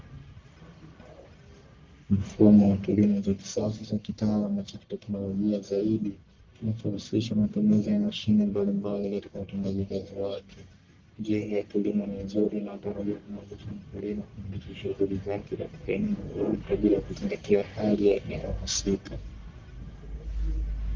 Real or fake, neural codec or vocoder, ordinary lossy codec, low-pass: fake; codec, 44.1 kHz, 1.7 kbps, Pupu-Codec; Opus, 24 kbps; 7.2 kHz